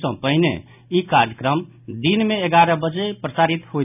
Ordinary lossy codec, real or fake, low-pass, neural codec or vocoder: none; real; 3.6 kHz; none